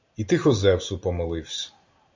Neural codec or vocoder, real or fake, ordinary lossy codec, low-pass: none; real; MP3, 64 kbps; 7.2 kHz